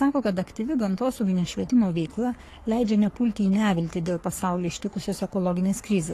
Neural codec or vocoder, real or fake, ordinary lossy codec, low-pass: codec, 44.1 kHz, 3.4 kbps, Pupu-Codec; fake; AAC, 48 kbps; 14.4 kHz